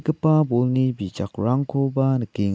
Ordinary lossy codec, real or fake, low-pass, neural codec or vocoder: none; real; none; none